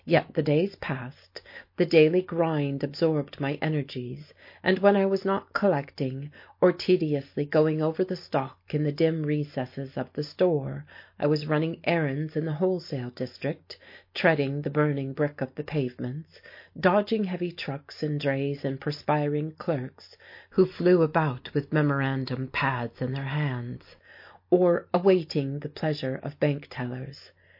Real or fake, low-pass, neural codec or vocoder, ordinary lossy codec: real; 5.4 kHz; none; MP3, 32 kbps